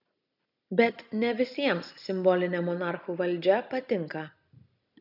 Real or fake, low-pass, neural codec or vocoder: real; 5.4 kHz; none